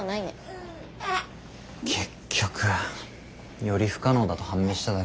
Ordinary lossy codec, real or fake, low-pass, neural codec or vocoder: none; real; none; none